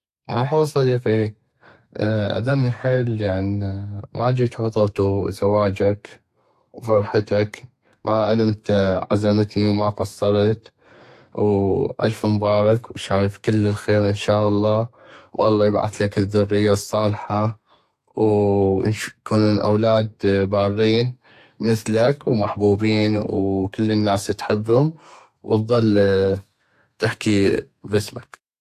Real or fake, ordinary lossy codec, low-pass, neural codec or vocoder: fake; AAC, 64 kbps; 14.4 kHz; codec, 32 kHz, 1.9 kbps, SNAC